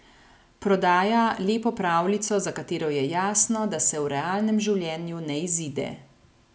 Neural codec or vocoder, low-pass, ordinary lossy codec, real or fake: none; none; none; real